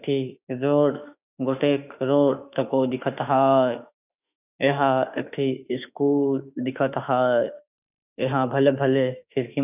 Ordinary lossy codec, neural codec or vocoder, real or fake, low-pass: none; autoencoder, 48 kHz, 32 numbers a frame, DAC-VAE, trained on Japanese speech; fake; 3.6 kHz